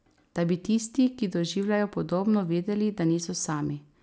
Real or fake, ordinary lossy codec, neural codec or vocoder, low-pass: real; none; none; none